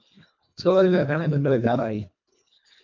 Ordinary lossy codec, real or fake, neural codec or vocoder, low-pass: MP3, 64 kbps; fake; codec, 24 kHz, 1.5 kbps, HILCodec; 7.2 kHz